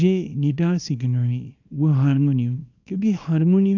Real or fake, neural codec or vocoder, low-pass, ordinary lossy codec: fake; codec, 24 kHz, 0.9 kbps, WavTokenizer, small release; 7.2 kHz; none